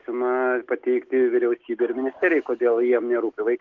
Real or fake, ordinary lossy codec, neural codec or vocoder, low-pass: real; Opus, 16 kbps; none; 7.2 kHz